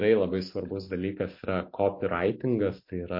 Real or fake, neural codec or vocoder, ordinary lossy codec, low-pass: real; none; MP3, 32 kbps; 5.4 kHz